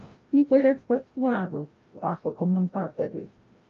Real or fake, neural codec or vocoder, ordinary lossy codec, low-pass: fake; codec, 16 kHz, 0.5 kbps, FreqCodec, larger model; Opus, 32 kbps; 7.2 kHz